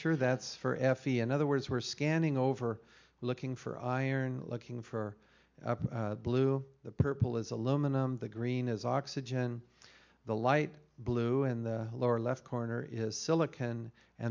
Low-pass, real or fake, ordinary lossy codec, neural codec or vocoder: 7.2 kHz; real; MP3, 64 kbps; none